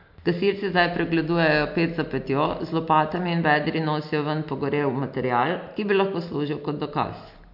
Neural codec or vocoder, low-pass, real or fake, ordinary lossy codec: none; 5.4 kHz; real; MP3, 48 kbps